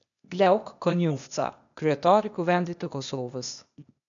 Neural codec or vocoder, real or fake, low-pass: codec, 16 kHz, 0.8 kbps, ZipCodec; fake; 7.2 kHz